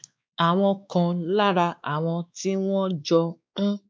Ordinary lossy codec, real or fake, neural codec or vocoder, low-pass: none; fake; codec, 16 kHz, 2 kbps, X-Codec, WavLM features, trained on Multilingual LibriSpeech; none